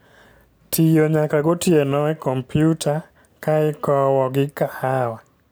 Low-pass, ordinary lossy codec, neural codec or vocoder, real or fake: none; none; none; real